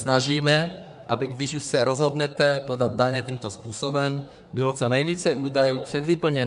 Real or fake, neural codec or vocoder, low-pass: fake; codec, 24 kHz, 1 kbps, SNAC; 10.8 kHz